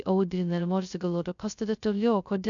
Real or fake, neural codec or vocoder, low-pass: fake; codec, 16 kHz, 0.2 kbps, FocalCodec; 7.2 kHz